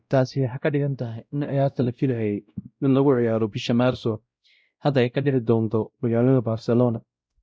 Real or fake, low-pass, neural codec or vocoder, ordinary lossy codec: fake; none; codec, 16 kHz, 0.5 kbps, X-Codec, WavLM features, trained on Multilingual LibriSpeech; none